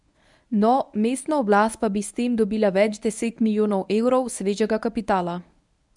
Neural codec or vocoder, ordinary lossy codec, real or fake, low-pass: codec, 24 kHz, 0.9 kbps, WavTokenizer, medium speech release version 1; none; fake; 10.8 kHz